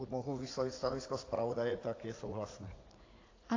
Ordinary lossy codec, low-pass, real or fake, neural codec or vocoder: AAC, 32 kbps; 7.2 kHz; fake; vocoder, 44.1 kHz, 80 mel bands, Vocos